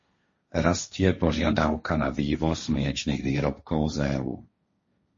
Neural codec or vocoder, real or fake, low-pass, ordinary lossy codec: codec, 16 kHz, 1.1 kbps, Voila-Tokenizer; fake; 7.2 kHz; MP3, 32 kbps